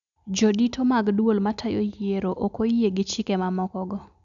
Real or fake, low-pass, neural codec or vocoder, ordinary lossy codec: real; 7.2 kHz; none; none